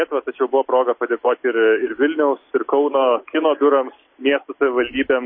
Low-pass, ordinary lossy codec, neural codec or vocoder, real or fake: 7.2 kHz; MP3, 24 kbps; none; real